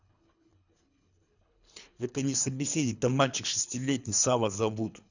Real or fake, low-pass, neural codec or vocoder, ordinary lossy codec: fake; 7.2 kHz; codec, 24 kHz, 3 kbps, HILCodec; none